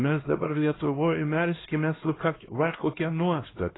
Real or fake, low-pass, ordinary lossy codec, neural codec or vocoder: fake; 7.2 kHz; AAC, 16 kbps; codec, 16 kHz, 1 kbps, X-Codec, HuBERT features, trained on LibriSpeech